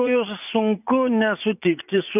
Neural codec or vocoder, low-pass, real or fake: vocoder, 44.1 kHz, 80 mel bands, Vocos; 3.6 kHz; fake